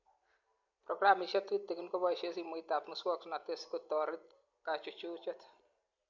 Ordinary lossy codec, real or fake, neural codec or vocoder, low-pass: MP3, 48 kbps; real; none; 7.2 kHz